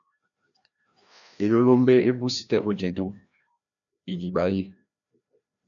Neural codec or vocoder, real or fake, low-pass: codec, 16 kHz, 1 kbps, FreqCodec, larger model; fake; 7.2 kHz